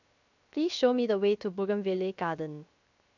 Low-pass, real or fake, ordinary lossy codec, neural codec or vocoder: 7.2 kHz; fake; none; codec, 16 kHz, 0.7 kbps, FocalCodec